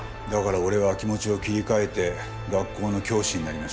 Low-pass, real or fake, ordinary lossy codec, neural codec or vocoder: none; real; none; none